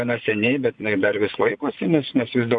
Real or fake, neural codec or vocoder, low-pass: fake; vocoder, 24 kHz, 100 mel bands, Vocos; 9.9 kHz